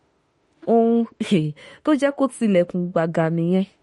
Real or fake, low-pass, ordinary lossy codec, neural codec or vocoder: fake; 19.8 kHz; MP3, 48 kbps; autoencoder, 48 kHz, 32 numbers a frame, DAC-VAE, trained on Japanese speech